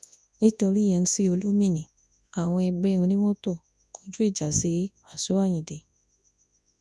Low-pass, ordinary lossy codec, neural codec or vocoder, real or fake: none; none; codec, 24 kHz, 0.9 kbps, WavTokenizer, large speech release; fake